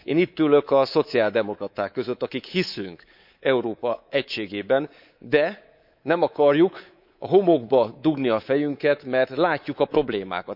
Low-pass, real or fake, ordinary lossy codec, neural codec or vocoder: 5.4 kHz; fake; none; codec, 24 kHz, 3.1 kbps, DualCodec